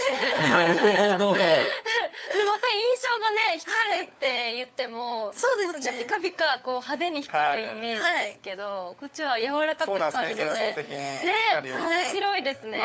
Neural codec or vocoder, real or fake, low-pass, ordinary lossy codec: codec, 16 kHz, 4 kbps, FunCodec, trained on LibriTTS, 50 frames a second; fake; none; none